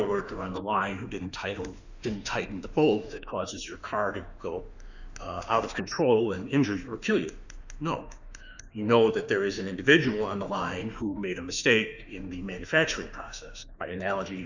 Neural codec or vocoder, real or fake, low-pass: autoencoder, 48 kHz, 32 numbers a frame, DAC-VAE, trained on Japanese speech; fake; 7.2 kHz